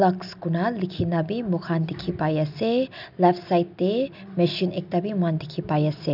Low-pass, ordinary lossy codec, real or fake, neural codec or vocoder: 5.4 kHz; none; real; none